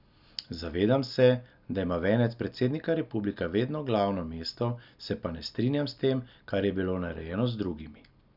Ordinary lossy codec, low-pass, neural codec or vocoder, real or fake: none; 5.4 kHz; none; real